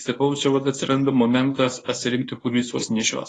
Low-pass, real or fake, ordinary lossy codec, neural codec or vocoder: 10.8 kHz; fake; AAC, 32 kbps; codec, 24 kHz, 0.9 kbps, WavTokenizer, medium speech release version 1